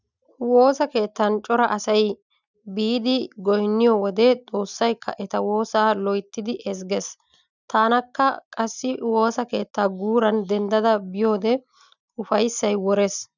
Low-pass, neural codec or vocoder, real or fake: 7.2 kHz; none; real